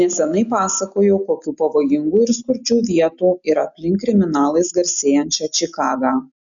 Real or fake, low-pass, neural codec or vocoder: real; 7.2 kHz; none